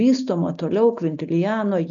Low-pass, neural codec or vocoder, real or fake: 7.2 kHz; none; real